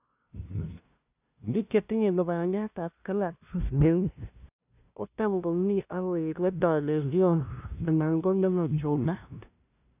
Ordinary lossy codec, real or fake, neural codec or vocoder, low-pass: none; fake; codec, 16 kHz, 0.5 kbps, FunCodec, trained on LibriTTS, 25 frames a second; 3.6 kHz